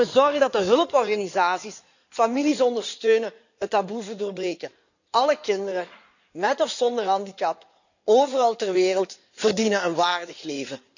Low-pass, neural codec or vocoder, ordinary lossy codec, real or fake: 7.2 kHz; codec, 16 kHz in and 24 kHz out, 2.2 kbps, FireRedTTS-2 codec; none; fake